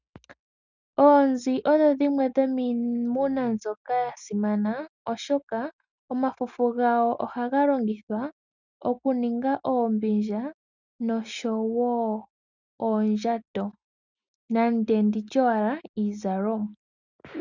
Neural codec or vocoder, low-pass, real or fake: none; 7.2 kHz; real